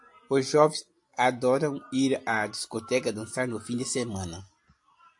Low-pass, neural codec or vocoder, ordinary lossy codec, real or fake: 10.8 kHz; none; AAC, 64 kbps; real